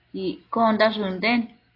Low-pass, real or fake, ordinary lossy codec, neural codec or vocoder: 5.4 kHz; real; AAC, 24 kbps; none